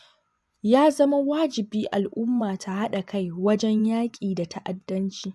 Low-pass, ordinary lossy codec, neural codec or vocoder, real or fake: none; none; vocoder, 24 kHz, 100 mel bands, Vocos; fake